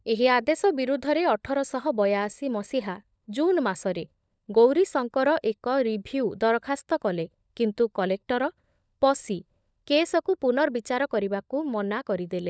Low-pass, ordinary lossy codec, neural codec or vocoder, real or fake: none; none; codec, 16 kHz, 16 kbps, FunCodec, trained on LibriTTS, 50 frames a second; fake